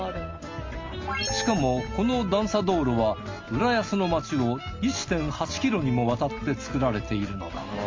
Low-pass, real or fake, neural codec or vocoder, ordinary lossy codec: 7.2 kHz; real; none; Opus, 32 kbps